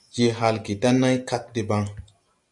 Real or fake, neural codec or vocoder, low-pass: real; none; 10.8 kHz